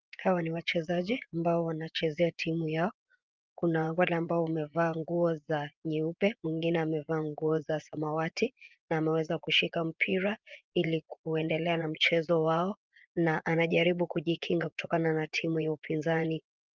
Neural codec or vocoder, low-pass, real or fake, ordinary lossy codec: vocoder, 24 kHz, 100 mel bands, Vocos; 7.2 kHz; fake; Opus, 24 kbps